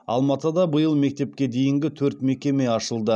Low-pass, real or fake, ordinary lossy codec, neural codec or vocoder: none; real; none; none